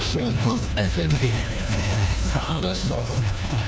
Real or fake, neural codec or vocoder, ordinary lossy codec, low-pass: fake; codec, 16 kHz, 1 kbps, FunCodec, trained on LibriTTS, 50 frames a second; none; none